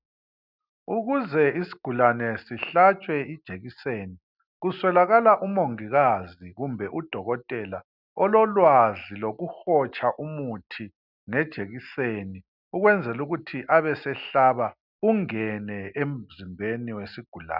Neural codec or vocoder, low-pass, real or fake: none; 5.4 kHz; real